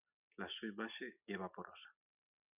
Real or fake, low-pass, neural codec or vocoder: real; 3.6 kHz; none